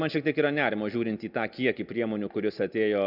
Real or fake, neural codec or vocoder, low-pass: real; none; 5.4 kHz